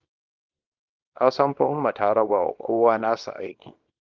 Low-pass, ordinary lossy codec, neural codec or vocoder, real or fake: 7.2 kHz; Opus, 32 kbps; codec, 24 kHz, 0.9 kbps, WavTokenizer, small release; fake